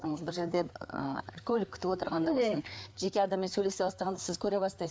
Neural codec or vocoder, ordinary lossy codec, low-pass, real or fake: codec, 16 kHz, 4 kbps, FreqCodec, larger model; none; none; fake